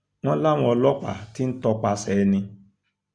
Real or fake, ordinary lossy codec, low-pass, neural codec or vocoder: real; Opus, 64 kbps; 9.9 kHz; none